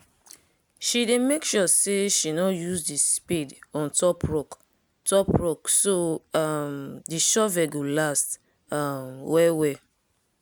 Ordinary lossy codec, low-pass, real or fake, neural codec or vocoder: none; none; real; none